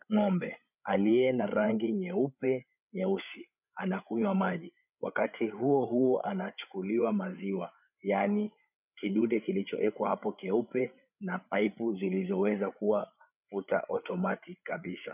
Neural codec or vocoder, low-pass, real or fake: codec, 16 kHz, 8 kbps, FreqCodec, larger model; 3.6 kHz; fake